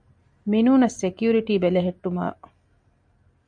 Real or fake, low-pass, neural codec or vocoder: real; 9.9 kHz; none